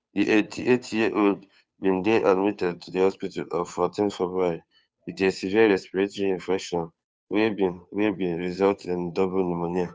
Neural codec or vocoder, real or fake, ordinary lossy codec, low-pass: codec, 16 kHz, 2 kbps, FunCodec, trained on Chinese and English, 25 frames a second; fake; none; none